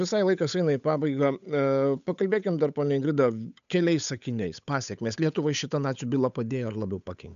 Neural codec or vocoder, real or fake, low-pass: codec, 16 kHz, 8 kbps, FunCodec, trained on Chinese and English, 25 frames a second; fake; 7.2 kHz